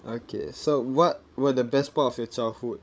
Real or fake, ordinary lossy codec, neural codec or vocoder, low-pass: fake; none; codec, 16 kHz, 16 kbps, FreqCodec, larger model; none